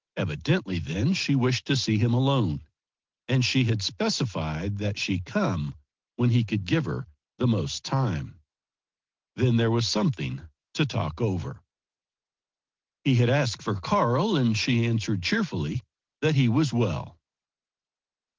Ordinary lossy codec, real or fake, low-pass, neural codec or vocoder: Opus, 16 kbps; real; 7.2 kHz; none